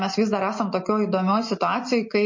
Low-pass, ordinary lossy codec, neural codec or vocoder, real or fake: 7.2 kHz; MP3, 32 kbps; none; real